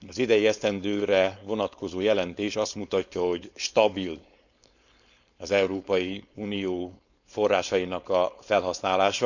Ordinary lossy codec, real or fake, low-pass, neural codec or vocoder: none; fake; 7.2 kHz; codec, 16 kHz, 4.8 kbps, FACodec